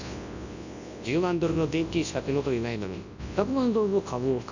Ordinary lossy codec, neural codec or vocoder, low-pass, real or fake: none; codec, 24 kHz, 0.9 kbps, WavTokenizer, large speech release; 7.2 kHz; fake